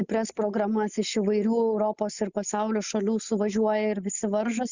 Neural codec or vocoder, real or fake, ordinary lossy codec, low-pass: none; real; Opus, 64 kbps; 7.2 kHz